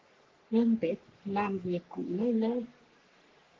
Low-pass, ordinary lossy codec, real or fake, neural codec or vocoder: 7.2 kHz; Opus, 16 kbps; fake; codec, 44.1 kHz, 3.4 kbps, Pupu-Codec